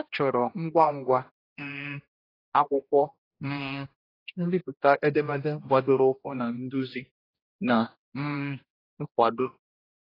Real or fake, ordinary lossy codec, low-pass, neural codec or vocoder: fake; AAC, 24 kbps; 5.4 kHz; codec, 16 kHz, 1 kbps, X-Codec, HuBERT features, trained on general audio